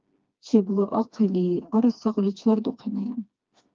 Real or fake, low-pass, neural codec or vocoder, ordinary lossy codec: fake; 7.2 kHz; codec, 16 kHz, 2 kbps, FreqCodec, smaller model; Opus, 24 kbps